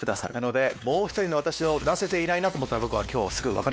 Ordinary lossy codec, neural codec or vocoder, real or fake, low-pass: none; codec, 16 kHz, 2 kbps, X-Codec, WavLM features, trained on Multilingual LibriSpeech; fake; none